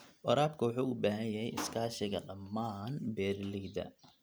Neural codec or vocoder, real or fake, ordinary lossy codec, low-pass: none; real; none; none